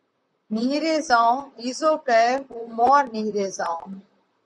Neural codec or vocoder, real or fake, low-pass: vocoder, 44.1 kHz, 128 mel bands, Pupu-Vocoder; fake; 10.8 kHz